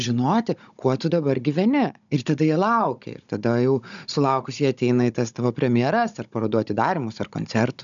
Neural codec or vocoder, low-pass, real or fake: codec, 16 kHz, 16 kbps, FunCodec, trained on Chinese and English, 50 frames a second; 7.2 kHz; fake